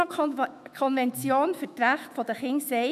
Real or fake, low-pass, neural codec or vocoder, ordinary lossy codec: fake; 14.4 kHz; autoencoder, 48 kHz, 128 numbers a frame, DAC-VAE, trained on Japanese speech; none